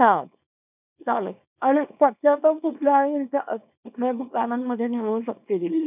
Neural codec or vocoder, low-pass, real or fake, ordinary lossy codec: codec, 24 kHz, 0.9 kbps, WavTokenizer, small release; 3.6 kHz; fake; none